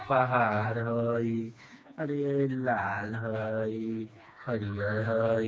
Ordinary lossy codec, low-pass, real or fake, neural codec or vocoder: none; none; fake; codec, 16 kHz, 2 kbps, FreqCodec, smaller model